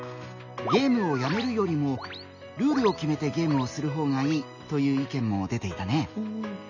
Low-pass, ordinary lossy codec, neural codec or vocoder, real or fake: 7.2 kHz; none; none; real